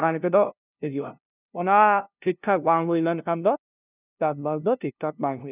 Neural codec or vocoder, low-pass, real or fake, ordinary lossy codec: codec, 16 kHz, 0.5 kbps, FunCodec, trained on LibriTTS, 25 frames a second; 3.6 kHz; fake; none